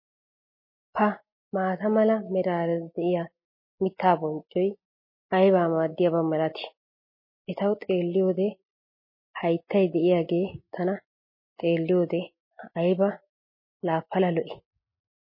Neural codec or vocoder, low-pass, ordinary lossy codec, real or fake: none; 5.4 kHz; MP3, 24 kbps; real